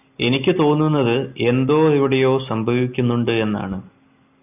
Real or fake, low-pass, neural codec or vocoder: real; 3.6 kHz; none